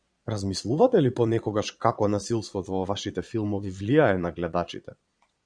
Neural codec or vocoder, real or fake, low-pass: vocoder, 22.05 kHz, 80 mel bands, Vocos; fake; 9.9 kHz